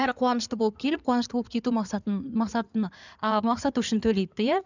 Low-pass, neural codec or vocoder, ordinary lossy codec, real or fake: 7.2 kHz; codec, 16 kHz in and 24 kHz out, 2.2 kbps, FireRedTTS-2 codec; none; fake